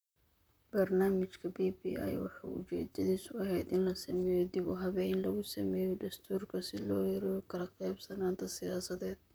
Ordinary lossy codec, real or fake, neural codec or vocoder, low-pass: none; fake; vocoder, 44.1 kHz, 128 mel bands, Pupu-Vocoder; none